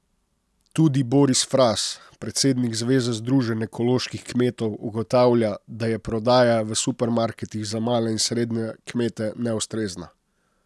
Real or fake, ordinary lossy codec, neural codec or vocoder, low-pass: real; none; none; none